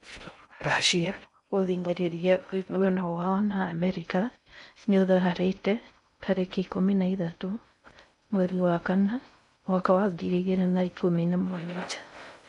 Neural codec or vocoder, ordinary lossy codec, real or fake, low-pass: codec, 16 kHz in and 24 kHz out, 0.6 kbps, FocalCodec, streaming, 4096 codes; none; fake; 10.8 kHz